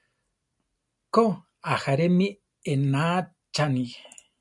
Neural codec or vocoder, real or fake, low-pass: none; real; 10.8 kHz